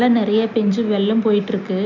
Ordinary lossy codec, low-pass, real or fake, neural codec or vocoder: none; 7.2 kHz; real; none